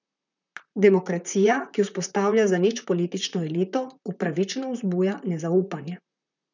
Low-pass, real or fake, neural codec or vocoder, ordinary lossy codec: 7.2 kHz; fake; vocoder, 44.1 kHz, 128 mel bands, Pupu-Vocoder; none